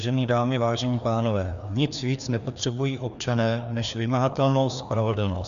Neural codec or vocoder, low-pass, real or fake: codec, 16 kHz, 2 kbps, FreqCodec, larger model; 7.2 kHz; fake